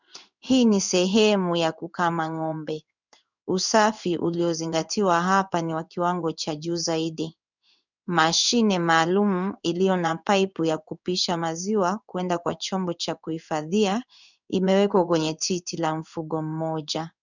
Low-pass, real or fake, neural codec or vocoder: 7.2 kHz; fake; codec, 16 kHz in and 24 kHz out, 1 kbps, XY-Tokenizer